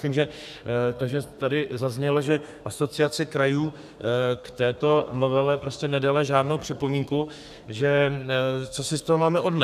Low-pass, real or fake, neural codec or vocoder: 14.4 kHz; fake; codec, 32 kHz, 1.9 kbps, SNAC